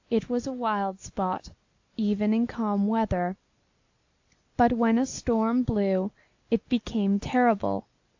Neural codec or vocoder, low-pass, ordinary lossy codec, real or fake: none; 7.2 kHz; AAC, 48 kbps; real